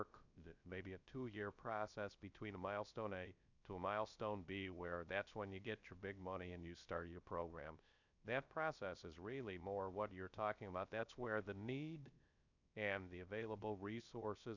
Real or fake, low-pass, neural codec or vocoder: fake; 7.2 kHz; codec, 16 kHz, 0.3 kbps, FocalCodec